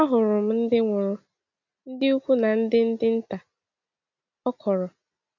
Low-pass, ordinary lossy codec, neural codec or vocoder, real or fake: 7.2 kHz; none; none; real